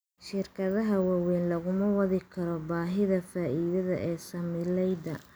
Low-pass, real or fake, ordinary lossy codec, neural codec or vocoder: none; real; none; none